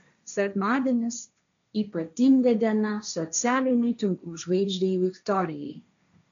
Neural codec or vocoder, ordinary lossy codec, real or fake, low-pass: codec, 16 kHz, 1.1 kbps, Voila-Tokenizer; MP3, 64 kbps; fake; 7.2 kHz